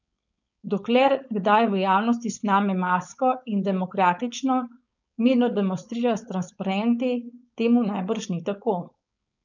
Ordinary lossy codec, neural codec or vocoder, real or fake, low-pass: none; codec, 16 kHz, 4.8 kbps, FACodec; fake; 7.2 kHz